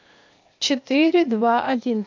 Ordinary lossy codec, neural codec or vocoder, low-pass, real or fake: MP3, 64 kbps; codec, 16 kHz, 0.8 kbps, ZipCodec; 7.2 kHz; fake